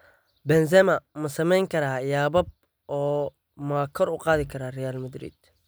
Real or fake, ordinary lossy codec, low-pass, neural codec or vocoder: real; none; none; none